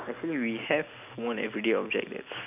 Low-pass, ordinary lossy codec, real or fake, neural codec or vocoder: 3.6 kHz; none; real; none